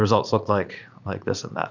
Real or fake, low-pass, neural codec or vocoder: fake; 7.2 kHz; vocoder, 44.1 kHz, 80 mel bands, Vocos